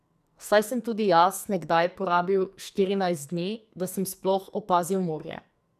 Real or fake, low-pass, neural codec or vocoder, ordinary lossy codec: fake; 14.4 kHz; codec, 44.1 kHz, 2.6 kbps, SNAC; none